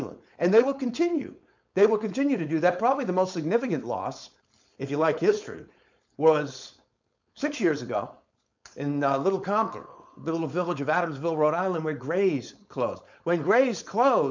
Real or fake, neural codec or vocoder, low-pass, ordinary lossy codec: fake; codec, 16 kHz, 4.8 kbps, FACodec; 7.2 kHz; MP3, 64 kbps